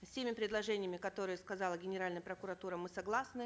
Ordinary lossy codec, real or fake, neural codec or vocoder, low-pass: none; real; none; none